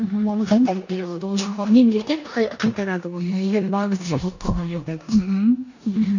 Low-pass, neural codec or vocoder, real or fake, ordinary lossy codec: 7.2 kHz; codec, 16 kHz in and 24 kHz out, 0.9 kbps, LongCat-Audio-Codec, four codebook decoder; fake; none